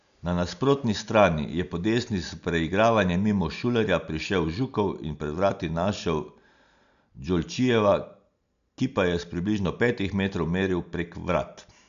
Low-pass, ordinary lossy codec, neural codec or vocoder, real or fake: 7.2 kHz; none; none; real